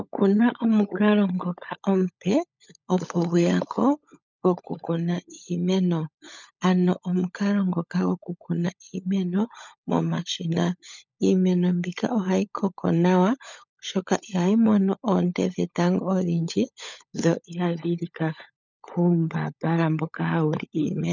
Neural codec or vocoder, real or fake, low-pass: codec, 16 kHz, 16 kbps, FunCodec, trained on LibriTTS, 50 frames a second; fake; 7.2 kHz